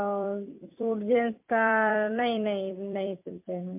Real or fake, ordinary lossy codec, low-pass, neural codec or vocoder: fake; MP3, 32 kbps; 3.6 kHz; vocoder, 44.1 kHz, 128 mel bands every 256 samples, BigVGAN v2